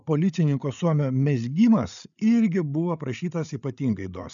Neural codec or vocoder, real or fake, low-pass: codec, 16 kHz, 8 kbps, FreqCodec, larger model; fake; 7.2 kHz